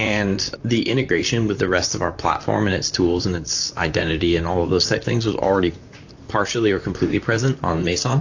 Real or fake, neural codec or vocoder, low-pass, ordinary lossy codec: fake; vocoder, 44.1 kHz, 128 mel bands, Pupu-Vocoder; 7.2 kHz; AAC, 48 kbps